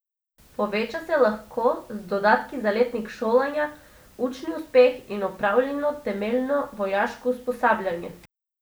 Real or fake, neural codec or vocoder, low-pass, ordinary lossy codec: real; none; none; none